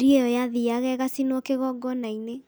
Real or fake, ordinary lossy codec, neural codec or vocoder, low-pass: real; none; none; none